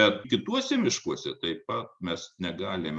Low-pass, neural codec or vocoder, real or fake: 10.8 kHz; none; real